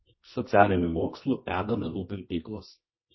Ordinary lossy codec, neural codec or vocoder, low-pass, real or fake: MP3, 24 kbps; codec, 24 kHz, 0.9 kbps, WavTokenizer, medium music audio release; 7.2 kHz; fake